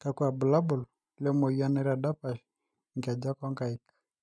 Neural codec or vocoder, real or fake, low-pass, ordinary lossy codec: none; real; none; none